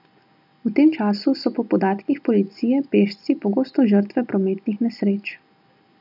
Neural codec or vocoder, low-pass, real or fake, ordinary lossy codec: none; 5.4 kHz; real; none